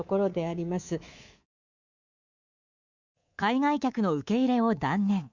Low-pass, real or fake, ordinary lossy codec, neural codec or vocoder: 7.2 kHz; fake; Opus, 64 kbps; codec, 24 kHz, 3.1 kbps, DualCodec